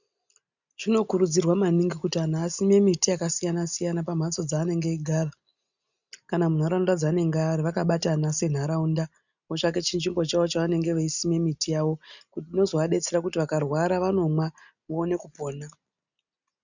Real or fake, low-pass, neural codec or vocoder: real; 7.2 kHz; none